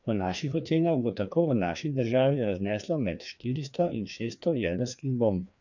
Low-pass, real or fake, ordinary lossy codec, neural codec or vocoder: 7.2 kHz; fake; none; codec, 16 kHz, 2 kbps, FreqCodec, larger model